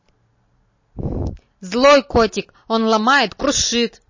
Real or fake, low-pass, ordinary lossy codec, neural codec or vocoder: real; 7.2 kHz; MP3, 32 kbps; none